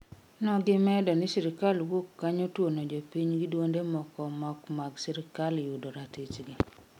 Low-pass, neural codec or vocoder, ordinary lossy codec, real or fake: 19.8 kHz; none; none; real